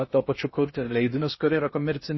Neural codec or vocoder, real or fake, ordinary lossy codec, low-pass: codec, 16 kHz in and 24 kHz out, 0.6 kbps, FocalCodec, streaming, 2048 codes; fake; MP3, 24 kbps; 7.2 kHz